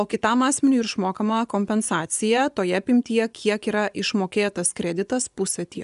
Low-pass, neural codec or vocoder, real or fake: 10.8 kHz; vocoder, 24 kHz, 100 mel bands, Vocos; fake